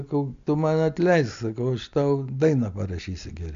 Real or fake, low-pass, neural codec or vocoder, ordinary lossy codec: real; 7.2 kHz; none; AAC, 64 kbps